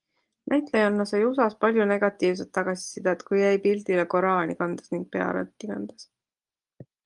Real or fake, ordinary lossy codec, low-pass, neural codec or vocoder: real; Opus, 32 kbps; 10.8 kHz; none